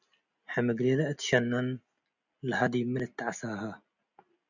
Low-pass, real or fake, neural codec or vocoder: 7.2 kHz; real; none